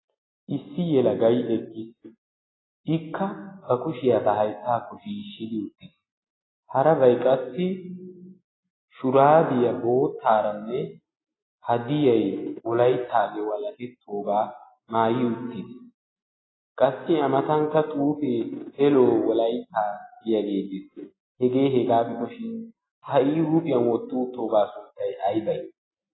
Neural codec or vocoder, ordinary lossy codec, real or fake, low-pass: none; AAC, 16 kbps; real; 7.2 kHz